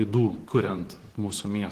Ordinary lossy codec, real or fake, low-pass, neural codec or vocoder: Opus, 16 kbps; fake; 14.4 kHz; vocoder, 44.1 kHz, 128 mel bands, Pupu-Vocoder